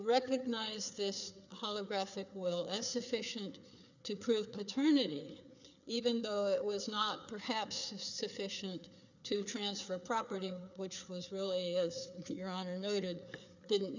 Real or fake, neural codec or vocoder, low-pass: fake; codec, 16 kHz, 4 kbps, FreqCodec, larger model; 7.2 kHz